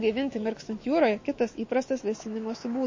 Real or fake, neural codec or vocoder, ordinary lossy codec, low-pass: fake; autoencoder, 48 kHz, 128 numbers a frame, DAC-VAE, trained on Japanese speech; MP3, 32 kbps; 7.2 kHz